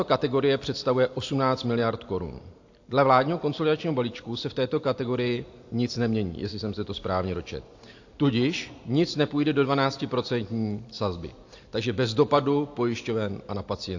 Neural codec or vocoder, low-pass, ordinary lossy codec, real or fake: none; 7.2 kHz; AAC, 48 kbps; real